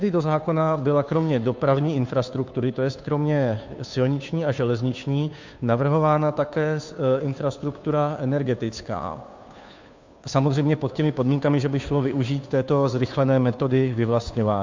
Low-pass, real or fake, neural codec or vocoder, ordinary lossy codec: 7.2 kHz; fake; codec, 16 kHz, 2 kbps, FunCodec, trained on Chinese and English, 25 frames a second; MP3, 64 kbps